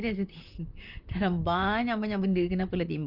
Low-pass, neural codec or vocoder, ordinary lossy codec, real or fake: 5.4 kHz; vocoder, 44.1 kHz, 128 mel bands, Pupu-Vocoder; Opus, 24 kbps; fake